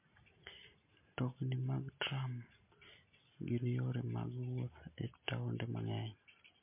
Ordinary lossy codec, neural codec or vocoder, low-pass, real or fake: MP3, 24 kbps; none; 3.6 kHz; real